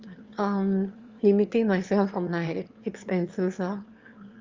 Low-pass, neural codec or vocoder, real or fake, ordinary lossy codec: 7.2 kHz; autoencoder, 22.05 kHz, a latent of 192 numbers a frame, VITS, trained on one speaker; fake; Opus, 32 kbps